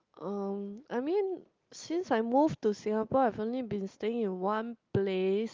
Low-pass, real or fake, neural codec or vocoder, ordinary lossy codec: 7.2 kHz; real; none; Opus, 32 kbps